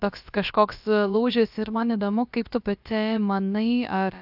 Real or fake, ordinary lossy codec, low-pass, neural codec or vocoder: fake; AAC, 48 kbps; 5.4 kHz; codec, 16 kHz, about 1 kbps, DyCAST, with the encoder's durations